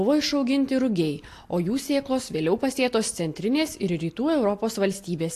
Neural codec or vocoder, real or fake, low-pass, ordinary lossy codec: none; real; 14.4 kHz; AAC, 64 kbps